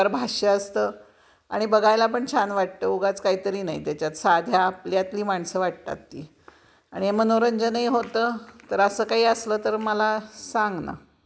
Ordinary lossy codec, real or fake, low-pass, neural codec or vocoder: none; real; none; none